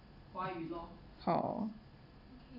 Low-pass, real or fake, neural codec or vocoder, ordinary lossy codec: 5.4 kHz; real; none; none